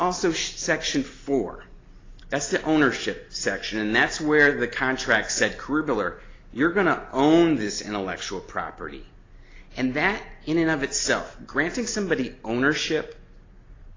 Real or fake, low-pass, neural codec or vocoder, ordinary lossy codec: real; 7.2 kHz; none; AAC, 32 kbps